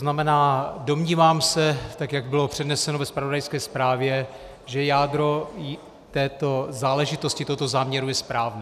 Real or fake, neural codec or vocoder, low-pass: real; none; 14.4 kHz